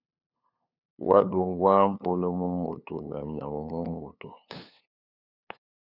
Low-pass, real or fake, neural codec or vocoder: 5.4 kHz; fake; codec, 16 kHz, 2 kbps, FunCodec, trained on LibriTTS, 25 frames a second